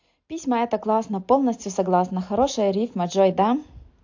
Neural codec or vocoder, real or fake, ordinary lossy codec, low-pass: none; real; AAC, 48 kbps; 7.2 kHz